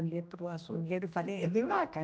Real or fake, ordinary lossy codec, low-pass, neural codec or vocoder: fake; none; none; codec, 16 kHz, 1 kbps, X-Codec, HuBERT features, trained on general audio